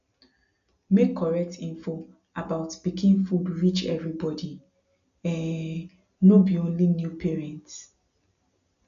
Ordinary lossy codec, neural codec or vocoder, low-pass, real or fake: MP3, 96 kbps; none; 7.2 kHz; real